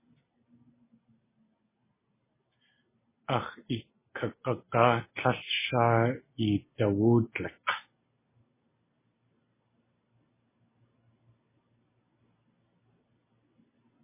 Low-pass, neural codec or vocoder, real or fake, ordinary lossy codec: 3.6 kHz; none; real; MP3, 16 kbps